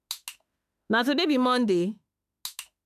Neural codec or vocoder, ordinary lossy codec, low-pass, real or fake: autoencoder, 48 kHz, 32 numbers a frame, DAC-VAE, trained on Japanese speech; none; 14.4 kHz; fake